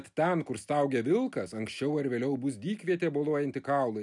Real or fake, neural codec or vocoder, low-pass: real; none; 10.8 kHz